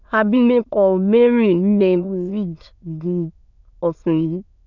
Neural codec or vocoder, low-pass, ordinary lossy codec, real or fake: autoencoder, 22.05 kHz, a latent of 192 numbers a frame, VITS, trained on many speakers; 7.2 kHz; none; fake